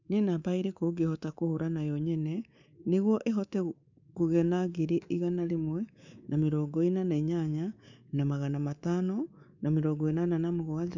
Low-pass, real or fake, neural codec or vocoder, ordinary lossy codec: 7.2 kHz; fake; codec, 24 kHz, 3.1 kbps, DualCodec; none